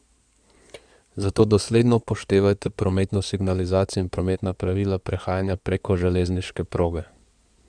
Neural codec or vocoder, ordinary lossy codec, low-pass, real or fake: codec, 16 kHz in and 24 kHz out, 2.2 kbps, FireRedTTS-2 codec; none; 9.9 kHz; fake